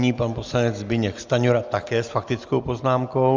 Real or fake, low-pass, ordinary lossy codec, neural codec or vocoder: real; 7.2 kHz; Opus, 32 kbps; none